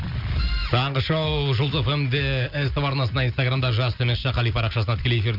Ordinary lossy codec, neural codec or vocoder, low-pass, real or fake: none; none; 5.4 kHz; real